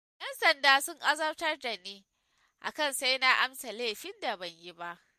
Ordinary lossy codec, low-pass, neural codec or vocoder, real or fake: MP3, 64 kbps; 14.4 kHz; none; real